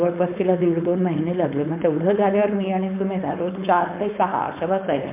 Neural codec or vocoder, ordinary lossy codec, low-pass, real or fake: codec, 16 kHz, 4.8 kbps, FACodec; MP3, 32 kbps; 3.6 kHz; fake